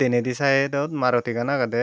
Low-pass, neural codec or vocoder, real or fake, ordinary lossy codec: none; none; real; none